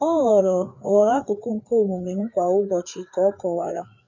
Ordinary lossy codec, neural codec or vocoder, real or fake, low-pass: none; codec, 16 kHz, 4 kbps, FreqCodec, larger model; fake; 7.2 kHz